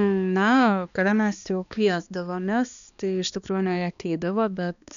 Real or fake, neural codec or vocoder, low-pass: fake; codec, 16 kHz, 2 kbps, X-Codec, HuBERT features, trained on balanced general audio; 7.2 kHz